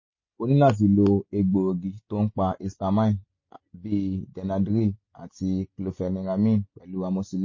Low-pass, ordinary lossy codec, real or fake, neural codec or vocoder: 7.2 kHz; MP3, 32 kbps; real; none